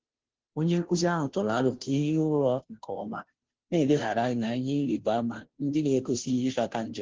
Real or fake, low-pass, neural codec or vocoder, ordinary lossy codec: fake; 7.2 kHz; codec, 16 kHz, 0.5 kbps, FunCodec, trained on Chinese and English, 25 frames a second; Opus, 16 kbps